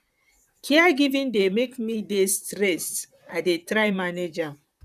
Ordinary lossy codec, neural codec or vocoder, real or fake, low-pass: none; vocoder, 44.1 kHz, 128 mel bands, Pupu-Vocoder; fake; 14.4 kHz